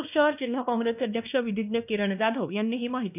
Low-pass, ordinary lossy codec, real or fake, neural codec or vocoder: 3.6 kHz; none; fake; codec, 16 kHz, 1 kbps, X-Codec, WavLM features, trained on Multilingual LibriSpeech